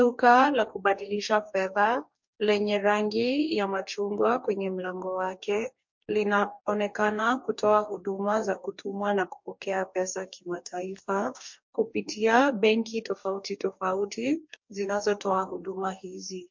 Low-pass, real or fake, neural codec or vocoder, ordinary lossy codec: 7.2 kHz; fake; codec, 44.1 kHz, 2.6 kbps, DAC; MP3, 48 kbps